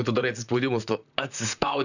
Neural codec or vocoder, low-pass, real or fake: vocoder, 44.1 kHz, 128 mel bands, Pupu-Vocoder; 7.2 kHz; fake